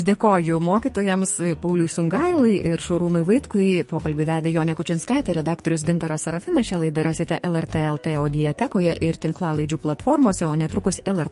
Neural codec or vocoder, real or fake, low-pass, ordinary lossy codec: codec, 44.1 kHz, 2.6 kbps, SNAC; fake; 14.4 kHz; MP3, 48 kbps